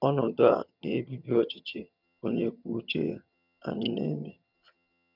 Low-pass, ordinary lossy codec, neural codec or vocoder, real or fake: 5.4 kHz; none; vocoder, 22.05 kHz, 80 mel bands, HiFi-GAN; fake